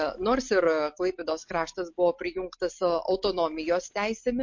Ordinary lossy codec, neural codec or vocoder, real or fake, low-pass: MP3, 48 kbps; none; real; 7.2 kHz